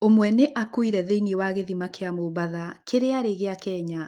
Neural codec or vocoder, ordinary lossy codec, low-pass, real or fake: autoencoder, 48 kHz, 128 numbers a frame, DAC-VAE, trained on Japanese speech; Opus, 24 kbps; 19.8 kHz; fake